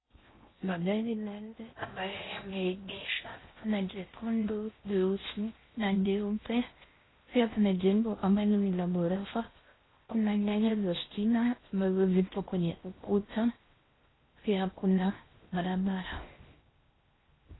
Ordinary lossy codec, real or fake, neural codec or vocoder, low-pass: AAC, 16 kbps; fake; codec, 16 kHz in and 24 kHz out, 0.6 kbps, FocalCodec, streaming, 4096 codes; 7.2 kHz